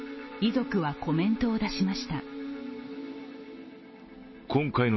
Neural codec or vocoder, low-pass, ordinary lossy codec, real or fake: none; 7.2 kHz; MP3, 24 kbps; real